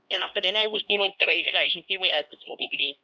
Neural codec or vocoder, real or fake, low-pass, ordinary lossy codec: codec, 16 kHz, 1 kbps, X-Codec, HuBERT features, trained on LibriSpeech; fake; none; none